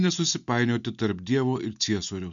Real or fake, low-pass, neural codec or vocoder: real; 7.2 kHz; none